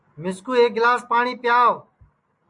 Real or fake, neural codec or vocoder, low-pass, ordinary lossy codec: real; none; 10.8 kHz; MP3, 64 kbps